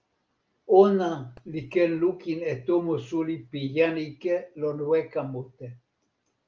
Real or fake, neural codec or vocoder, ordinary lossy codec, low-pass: real; none; Opus, 24 kbps; 7.2 kHz